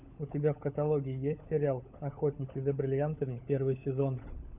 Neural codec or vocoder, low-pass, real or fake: codec, 16 kHz, 16 kbps, FunCodec, trained on LibriTTS, 50 frames a second; 3.6 kHz; fake